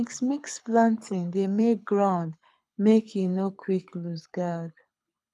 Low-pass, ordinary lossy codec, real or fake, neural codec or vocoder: none; none; fake; codec, 24 kHz, 6 kbps, HILCodec